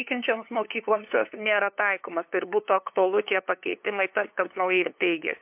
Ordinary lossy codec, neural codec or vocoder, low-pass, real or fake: MP3, 32 kbps; codec, 16 kHz, 2 kbps, FunCodec, trained on LibriTTS, 25 frames a second; 3.6 kHz; fake